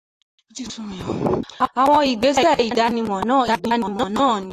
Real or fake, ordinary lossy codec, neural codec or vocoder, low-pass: fake; AAC, 48 kbps; vocoder, 44.1 kHz, 128 mel bands, Pupu-Vocoder; 14.4 kHz